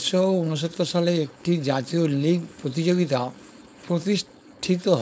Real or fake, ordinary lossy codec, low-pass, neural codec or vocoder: fake; none; none; codec, 16 kHz, 4.8 kbps, FACodec